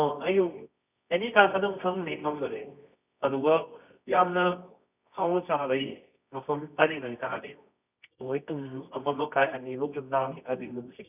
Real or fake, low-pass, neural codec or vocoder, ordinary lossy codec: fake; 3.6 kHz; codec, 24 kHz, 0.9 kbps, WavTokenizer, medium music audio release; none